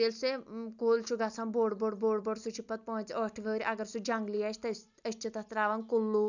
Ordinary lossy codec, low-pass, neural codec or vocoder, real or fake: none; 7.2 kHz; none; real